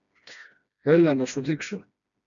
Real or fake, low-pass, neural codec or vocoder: fake; 7.2 kHz; codec, 16 kHz, 1 kbps, FreqCodec, smaller model